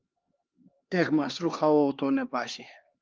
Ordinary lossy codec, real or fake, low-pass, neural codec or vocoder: Opus, 32 kbps; fake; 7.2 kHz; codec, 16 kHz, 2 kbps, X-Codec, HuBERT features, trained on LibriSpeech